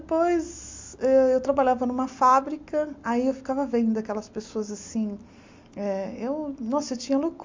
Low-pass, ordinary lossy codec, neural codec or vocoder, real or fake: 7.2 kHz; MP3, 64 kbps; none; real